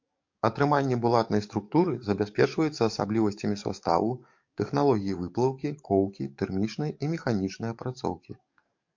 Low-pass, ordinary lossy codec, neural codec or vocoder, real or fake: 7.2 kHz; MP3, 48 kbps; codec, 44.1 kHz, 7.8 kbps, DAC; fake